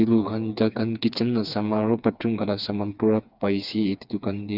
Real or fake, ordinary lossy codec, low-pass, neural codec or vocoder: fake; none; 5.4 kHz; codec, 16 kHz, 4 kbps, FreqCodec, smaller model